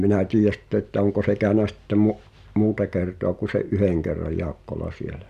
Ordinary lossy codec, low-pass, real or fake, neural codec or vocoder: none; 14.4 kHz; real; none